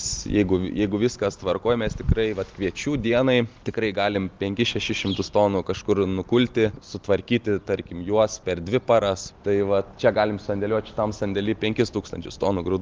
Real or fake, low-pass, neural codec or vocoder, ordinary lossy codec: real; 7.2 kHz; none; Opus, 32 kbps